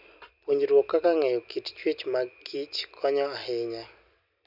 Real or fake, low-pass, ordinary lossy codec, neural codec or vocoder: real; 5.4 kHz; none; none